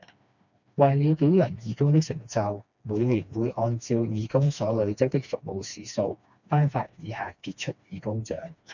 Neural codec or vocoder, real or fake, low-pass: codec, 16 kHz, 2 kbps, FreqCodec, smaller model; fake; 7.2 kHz